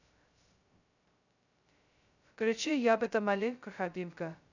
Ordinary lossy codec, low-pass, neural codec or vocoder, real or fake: none; 7.2 kHz; codec, 16 kHz, 0.2 kbps, FocalCodec; fake